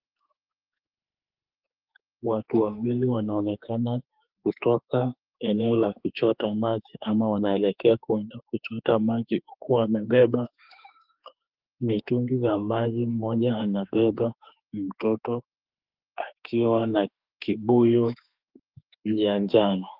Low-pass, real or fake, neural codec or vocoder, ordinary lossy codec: 5.4 kHz; fake; autoencoder, 48 kHz, 32 numbers a frame, DAC-VAE, trained on Japanese speech; Opus, 32 kbps